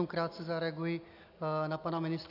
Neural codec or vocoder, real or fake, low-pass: none; real; 5.4 kHz